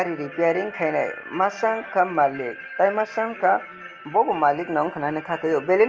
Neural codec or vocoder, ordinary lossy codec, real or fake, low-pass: none; Opus, 24 kbps; real; 7.2 kHz